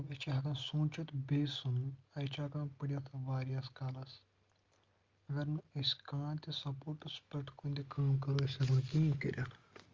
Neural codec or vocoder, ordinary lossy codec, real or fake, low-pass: none; Opus, 32 kbps; real; 7.2 kHz